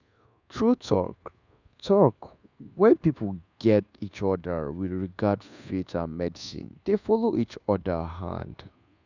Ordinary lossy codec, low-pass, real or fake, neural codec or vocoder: none; 7.2 kHz; fake; codec, 24 kHz, 1.2 kbps, DualCodec